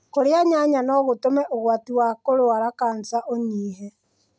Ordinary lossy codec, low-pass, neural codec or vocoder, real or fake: none; none; none; real